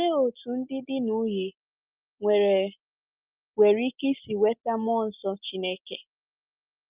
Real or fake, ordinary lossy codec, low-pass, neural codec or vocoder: real; Opus, 24 kbps; 3.6 kHz; none